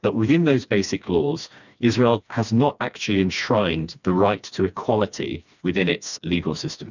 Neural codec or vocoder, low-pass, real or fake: codec, 16 kHz, 2 kbps, FreqCodec, smaller model; 7.2 kHz; fake